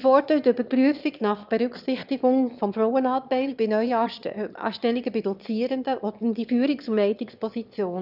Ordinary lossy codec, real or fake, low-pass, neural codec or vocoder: none; fake; 5.4 kHz; autoencoder, 22.05 kHz, a latent of 192 numbers a frame, VITS, trained on one speaker